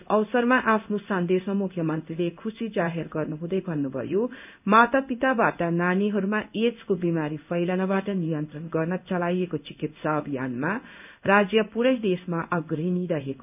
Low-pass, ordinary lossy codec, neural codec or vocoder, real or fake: 3.6 kHz; none; codec, 16 kHz in and 24 kHz out, 1 kbps, XY-Tokenizer; fake